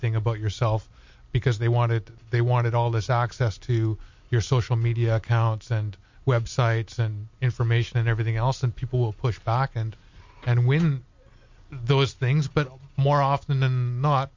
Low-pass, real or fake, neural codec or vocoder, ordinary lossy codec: 7.2 kHz; real; none; MP3, 48 kbps